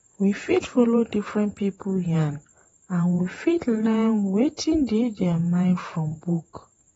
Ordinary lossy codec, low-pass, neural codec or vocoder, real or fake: AAC, 24 kbps; 19.8 kHz; vocoder, 48 kHz, 128 mel bands, Vocos; fake